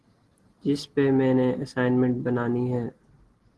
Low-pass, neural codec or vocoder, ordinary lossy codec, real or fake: 10.8 kHz; none; Opus, 16 kbps; real